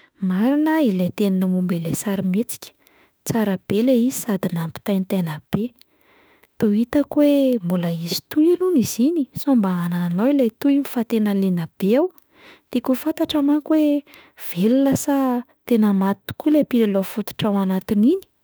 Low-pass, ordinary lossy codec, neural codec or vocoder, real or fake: none; none; autoencoder, 48 kHz, 32 numbers a frame, DAC-VAE, trained on Japanese speech; fake